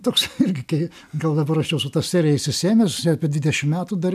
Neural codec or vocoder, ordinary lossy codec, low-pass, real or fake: none; MP3, 96 kbps; 14.4 kHz; real